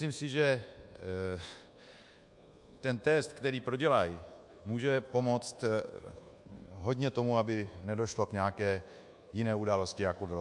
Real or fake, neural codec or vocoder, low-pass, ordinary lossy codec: fake; codec, 24 kHz, 1.2 kbps, DualCodec; 10.8 kHz; MP3, 64 kbps